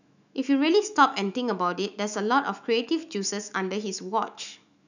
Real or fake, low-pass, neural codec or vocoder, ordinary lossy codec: fake; 7.2 kHz; autoencoder, 48 kHz, 128 numbers a frame, DAC-VAE, trained on Japanese speech; none